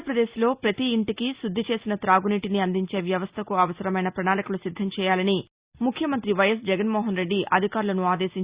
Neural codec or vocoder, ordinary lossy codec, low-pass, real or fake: none; Opus, 64 kbps; 3.6 kHz; real